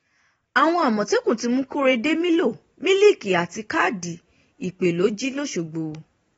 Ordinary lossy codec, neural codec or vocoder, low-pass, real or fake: AAC, 24 kbps; vocoder, 44.1 kHz, 128 mel bands every 512 samples, BigVGAN v2; 19.8 kHz; fake